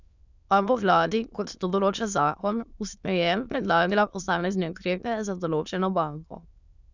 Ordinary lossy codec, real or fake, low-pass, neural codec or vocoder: none; fake; 7.2 kHz; autoencoder, 22.05 kHz, a latent of 192 numbers a frame, VITS, trained on many speakers